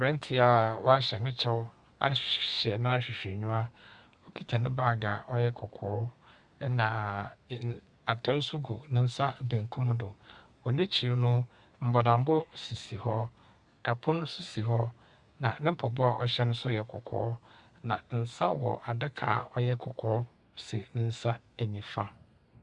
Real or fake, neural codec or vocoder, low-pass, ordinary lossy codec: fake; codec, 32 kHz, 1.9 kbps, SNAC; 10.8 kHz; Opus, 64 kbps